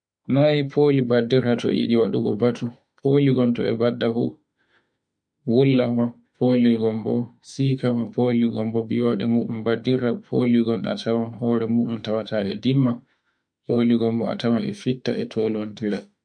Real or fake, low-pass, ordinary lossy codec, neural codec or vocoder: fake; 9.9 kHz; MP3, 64 kbps; autoencoder, 48 kHz, 32 numbers a frame, DAC-VAE, trained on Japanese speech